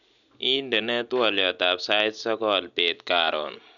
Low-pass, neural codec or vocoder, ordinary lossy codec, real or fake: 7.2 kHz; none; none; real